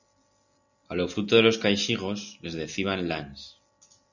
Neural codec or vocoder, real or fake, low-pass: none; real; 7.2 kHz